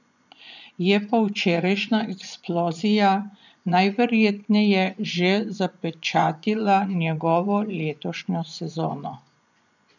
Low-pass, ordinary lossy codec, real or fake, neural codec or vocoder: 7.2 kHz; none; fake; vocoder, 24 kHz, 100 mel bands, Vocos